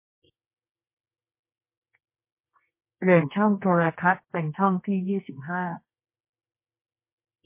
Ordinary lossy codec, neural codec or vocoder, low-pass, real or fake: MP3, 24 kbps; codec, 24 kHz, 0.9 kbps, WavTokenizer, medium music audio release; 3.6 kHz; fake